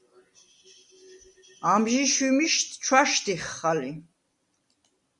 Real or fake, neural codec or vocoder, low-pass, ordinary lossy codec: real; none; 10.8 kHz; Opus, 64 kbps